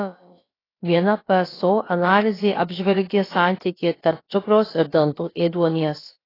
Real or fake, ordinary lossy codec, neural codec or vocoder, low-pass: fake; AAC, 24 kbps; codec, 16 kHz, about 1 kbps, DyCAST, with the encoder's durations; 5.4 kHz